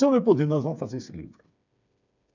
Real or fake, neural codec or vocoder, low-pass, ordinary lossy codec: fake; codec, 16 kHz, 4 kbps, FreqCodec, smaller model; 7.2 kHz; none